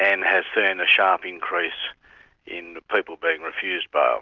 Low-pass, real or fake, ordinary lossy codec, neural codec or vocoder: 7.2 kHz; real; Opus, 24 kbps; none